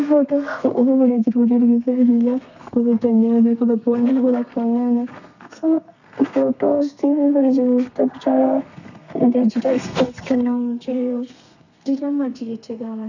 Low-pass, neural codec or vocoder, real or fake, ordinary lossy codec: 7.2 kHz; codec, 32 kHz, 1.9 kbps, SNAC; fake; none